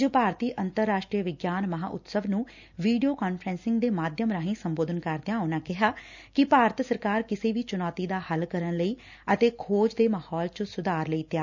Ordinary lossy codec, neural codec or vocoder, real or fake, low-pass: none; none; real; 7.2 kHz